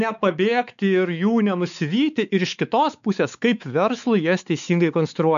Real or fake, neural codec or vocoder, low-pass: fake; codec, 16 kHz, 4 kbps, X-Codec, WavLM features, trained on Multilingual LibriSpeech; 7.2 kHz